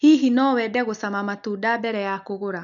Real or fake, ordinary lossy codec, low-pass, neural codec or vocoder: real; none; 7.2 kHz; none